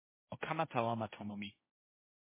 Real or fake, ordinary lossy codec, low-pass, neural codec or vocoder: fake; MP3, 16 kbps; 3.6 kHz; codec, 16 kHz, 1.1 kbps, Voila-Tokenizer